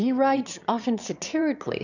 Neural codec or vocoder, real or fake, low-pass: autoencoder, 22.05 kHz, a latent of 192 numbers a frame, VITS, trained on one speaker; fake; 7.2 kHz